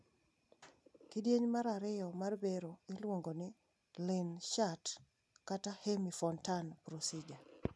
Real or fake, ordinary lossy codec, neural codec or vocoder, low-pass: real; none; none; none